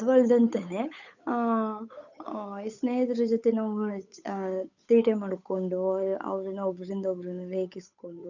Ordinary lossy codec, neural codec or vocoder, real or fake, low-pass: none; codec, 16 kHz, 8 kbps, FunCodec, trained on Chinese and English, 25 frames a second; fake; 7.2 kHz